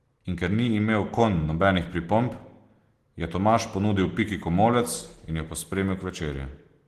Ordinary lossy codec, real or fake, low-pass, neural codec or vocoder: Opus, 16 kbps; real; 14.4 kHz; none